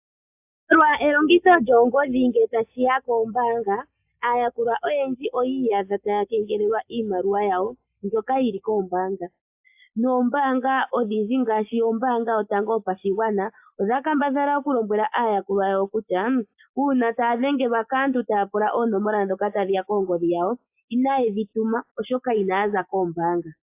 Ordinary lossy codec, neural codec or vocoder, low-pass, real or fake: AAC, 32 kbps; none; 3.6 kHz; real